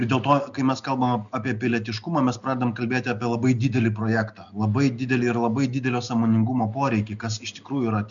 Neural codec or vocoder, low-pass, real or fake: none; 7.2 kHz; real